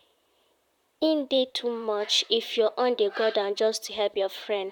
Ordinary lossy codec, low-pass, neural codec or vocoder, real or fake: none; 19.8 kHz; vocoder, 44.1 kHz, 128 mel bands, Pupu-Vocoder; fake